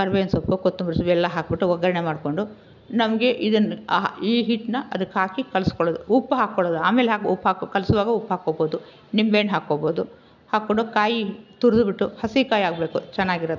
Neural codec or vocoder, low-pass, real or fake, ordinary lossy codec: none; 7.2 kHz; real; none